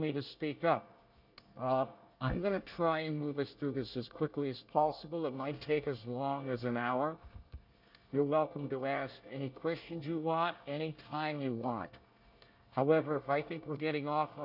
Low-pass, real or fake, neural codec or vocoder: 5.4 kHz; fake; codec, 24 kHz, 1 kbps, SNAC